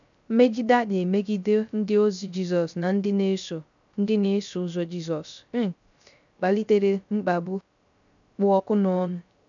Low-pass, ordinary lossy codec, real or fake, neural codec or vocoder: 7.2 kHz; none; fake; codec, 16 kHz, 0.3 kbps, FocalCodec